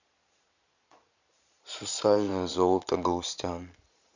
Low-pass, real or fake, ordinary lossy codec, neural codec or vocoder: 7.2 kHz; fake; none; vocoder, 22.05 kHz, 80 mel bands, Vocos